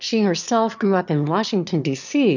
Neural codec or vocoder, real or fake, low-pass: autoencoder, 22.05 kHz, a latent of 192 numbers a frame, VITS, trained on one speaker; fake; 7.2 kHz